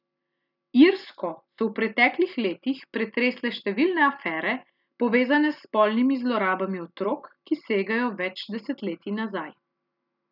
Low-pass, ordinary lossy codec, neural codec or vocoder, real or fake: 5.4 kHz; none; none; real